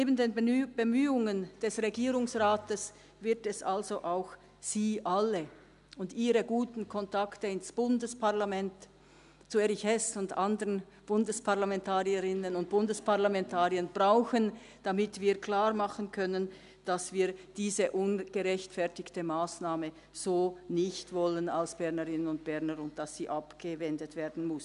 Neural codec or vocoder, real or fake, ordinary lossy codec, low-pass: none; real; none; 10.8 kHz